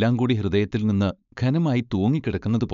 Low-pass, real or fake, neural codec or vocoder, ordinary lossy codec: 7.2 kHz; fake; codec, 16 kHz, 4.8 kbps, FACodec; none